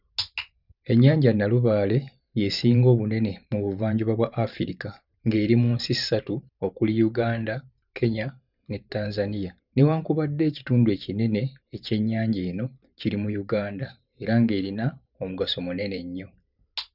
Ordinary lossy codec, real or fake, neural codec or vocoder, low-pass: none; fake; vocoder, 44.1 kHz, 128 mel bands every 512 samples, BigVGAN v2; 5.4 kHz